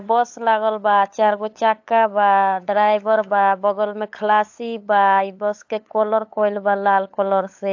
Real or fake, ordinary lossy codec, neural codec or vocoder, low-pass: fake; AAC, 48 kbps; codec, 16 kHz, 8 kbps, FunCodec, trained on LibriTTS, 25 frames a second; 7.2 kHz